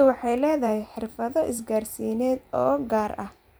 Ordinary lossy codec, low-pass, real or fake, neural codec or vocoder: none; none; fake; vocoder, 44.1 kHz, 128 mel bands every 512 samples, BigVGAN v2